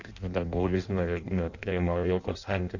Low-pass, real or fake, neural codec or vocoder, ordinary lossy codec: 7.2 kHz; fake; codec, 16 kHz in and 24 kHz out, 0.6 kbps, FireRedTTS-2 codec; Opus, 64 kbps